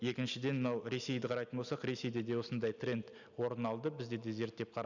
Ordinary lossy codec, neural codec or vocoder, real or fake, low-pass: none; none; real; 7.2 kHz